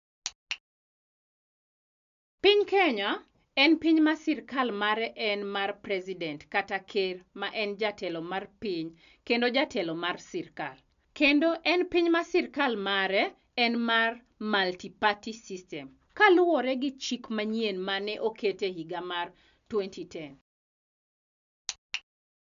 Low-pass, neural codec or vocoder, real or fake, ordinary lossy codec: 7.2 kHz; none; real; none